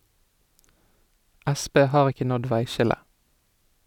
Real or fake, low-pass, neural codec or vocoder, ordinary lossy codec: real; 19.8 kHz; none; none